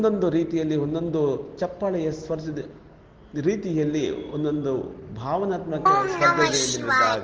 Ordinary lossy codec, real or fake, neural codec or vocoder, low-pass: Opus, 16 kbps; real; none; 7.2 kHz